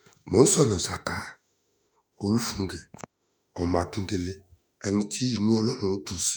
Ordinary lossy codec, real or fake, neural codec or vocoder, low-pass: none; fake; autoencoder, 48 kHz, 32 numbers a frame, DAC-VAE, trained on Japanese speech; none